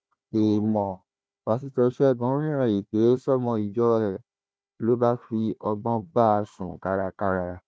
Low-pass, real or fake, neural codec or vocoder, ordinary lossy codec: none; fake; codec, 16 kHz, 1 kbps, FunCodec, trained on Chinese and English, 50 frames a second; none